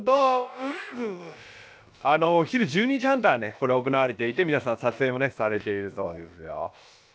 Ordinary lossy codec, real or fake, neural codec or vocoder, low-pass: none; fake; codec, 16 kHz, about 1 kbps, DyCAST, with the encoder's durations; none